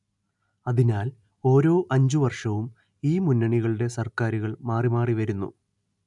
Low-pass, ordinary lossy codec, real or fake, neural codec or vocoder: 10.8 kHz; none; real; none